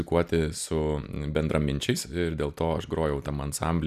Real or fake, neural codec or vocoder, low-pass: real; none; 14.4 kHz